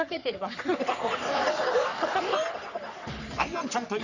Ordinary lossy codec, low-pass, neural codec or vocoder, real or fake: none; 7.2 kHz; codec, 44.1 kHz, 3.4 kbps, Pupu-Codec; fake